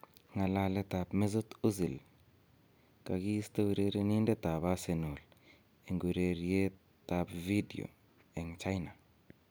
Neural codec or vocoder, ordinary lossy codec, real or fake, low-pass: none; none; real; none